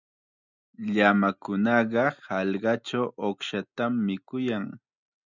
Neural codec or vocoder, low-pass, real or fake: none; 7.2 kHz; real